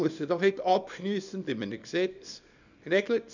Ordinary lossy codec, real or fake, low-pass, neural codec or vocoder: none; fake; 7.2 kHz; codec, 24 kHz, 0.9 kbps, WavTokenizer, small release